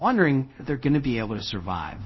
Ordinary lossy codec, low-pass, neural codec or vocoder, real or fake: MP3, 24 kbps; 7.2 kHz; codec, 24 kHz, 0.5 kbps, DualCodec; fake